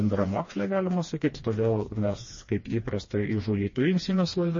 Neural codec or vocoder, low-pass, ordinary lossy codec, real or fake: codec, 16 kHz, 2 kbps, FreqCodec, smaller model; 7.2 kHz; MP3, 32 kbps; fake